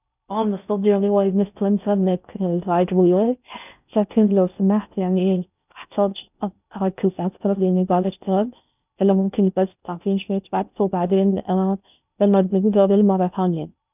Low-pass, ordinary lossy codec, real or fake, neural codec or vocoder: 3.6 kHz; none; fake; codec, 16 kHz in and 24 kHz out, 0.6 kbps, FocalCodec, streaming, 4096 codes